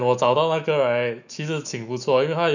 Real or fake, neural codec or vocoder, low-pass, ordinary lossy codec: fake; autoencoder, 48 kHz, 128 numbers a frame, DAC-VAE, trained on Japanese speech; 7.2 kHz; none